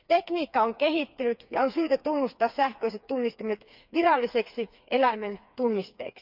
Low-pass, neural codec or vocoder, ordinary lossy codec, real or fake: 5.4 kHz; codec, 16 kHz, 4 kbps, FreqCodec, smaller model; none; fake